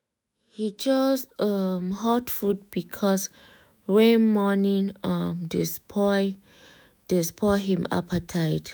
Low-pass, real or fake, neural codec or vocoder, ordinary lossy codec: none; fake; autoencoder, 48 kHz, 128 numbers a frame, DAC-VAE, trained on Japanese speech; none